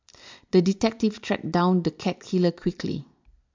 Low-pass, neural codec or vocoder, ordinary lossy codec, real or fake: 7.2 kHz; none; MP3, 64 kbps; real